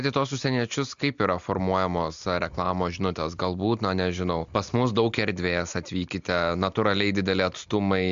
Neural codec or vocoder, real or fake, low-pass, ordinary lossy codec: none; real; 7.2 kHz; MP3, 64 kbps